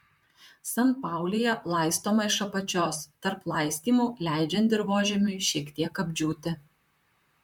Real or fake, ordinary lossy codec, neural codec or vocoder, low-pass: fake; MP3, 96 kbps; vocoder, 44.1 kHz, 128 mel bands, Pupu-Vocoder; 19.8 kHz